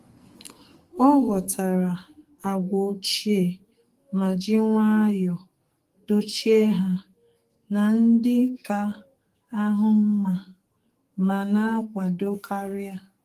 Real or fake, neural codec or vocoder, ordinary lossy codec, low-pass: fake; codec, 44.1 kHz, 2.6 kbps, SNAC; Opus, 24 kbps; 14.4 kHz